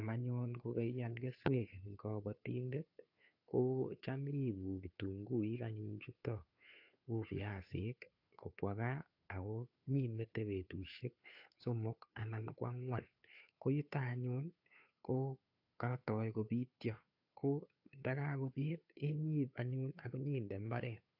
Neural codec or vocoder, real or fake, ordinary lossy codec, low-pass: codec, 16 kHz, 2 kbps, FunCodec, trained on Chinese and English, 25 frames a second; fake; AAC, 48 kbps; 5.4 kHz